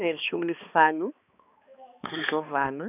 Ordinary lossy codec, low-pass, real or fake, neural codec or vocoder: none; 3.6 kHz; fake; codec, 16 kHz, 2 kbps, X-Codec, HuBERT features, trained on balanced general audio